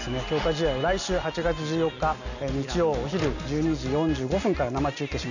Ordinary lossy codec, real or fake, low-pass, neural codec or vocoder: none; real; 7.2 kHz; none